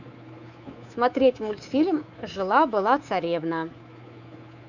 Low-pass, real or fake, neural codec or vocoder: 7.2 kHz; fake; codec, 24 kHz, 3.1 kbps, DualCodec